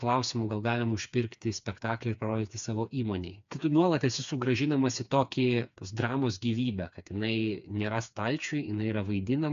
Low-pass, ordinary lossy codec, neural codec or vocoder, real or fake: 7.2 kHz; AAC, 96 kbps; codec, 16 kHz, 4 kbps, FreqCodec, smaller model; fake